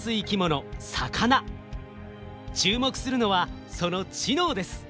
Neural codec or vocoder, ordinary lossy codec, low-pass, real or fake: none; none; none; real